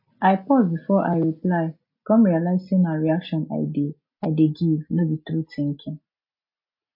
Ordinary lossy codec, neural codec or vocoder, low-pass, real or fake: MP3, 32 kbps; none; 5.4 kHz; real